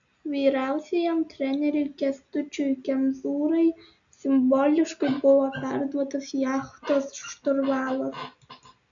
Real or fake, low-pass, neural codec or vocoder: real; 7.2 kHz; none